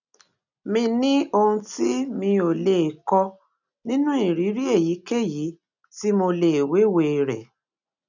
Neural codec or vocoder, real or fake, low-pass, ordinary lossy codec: none; real; 7.2 kHz; none